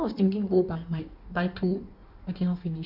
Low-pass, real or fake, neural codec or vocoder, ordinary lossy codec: 5.4 kHz; fake; codec, 16 kHz in and 24 kHz out, 1.1 kbps, FireRedTTS-2 codec; none